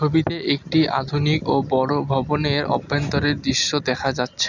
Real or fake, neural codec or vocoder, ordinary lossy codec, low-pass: real; none; none; 7.2 kHz